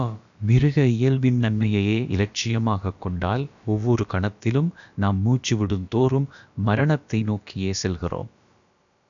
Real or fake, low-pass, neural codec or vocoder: fake; 7.2 kHz; codec, 16 kHz, about 1 kbps, DyCAST, with the encoder's durations